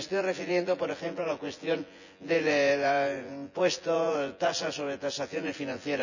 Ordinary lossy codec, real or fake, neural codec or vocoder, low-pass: none; fake; vocoder, 24 kHz, 100 mel bands, Vocos; 7.2 kHz